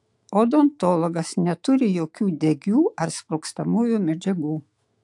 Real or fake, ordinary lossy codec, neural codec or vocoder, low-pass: fake; AAC, 64 kbps; autoencoder, 48 kHz, 128 numbers a frame, DAC-VAE, trained on Japanese speech; 10.8 kHz